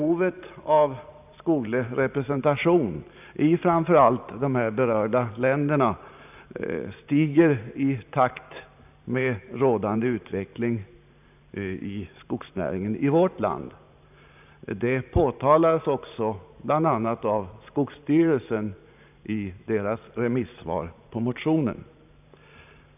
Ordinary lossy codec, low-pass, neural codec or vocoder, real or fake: none; 3.6 kHz; vocoder, 44.1 kHz, 128 mel bands every 512 samples, BigVGAN v2; fake